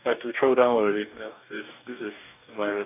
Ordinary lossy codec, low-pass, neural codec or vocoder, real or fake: none; 3.6 kHz; codec, 44.1 kHz, 2.6 kbps, DAC; fake